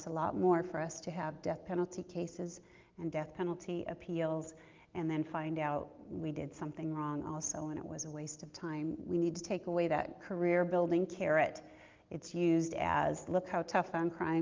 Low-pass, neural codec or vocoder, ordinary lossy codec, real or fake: 7.2 kHz; none; Opus, 32 kbps; real